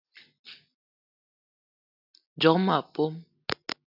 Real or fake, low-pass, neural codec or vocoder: real; 5.4 kHz; none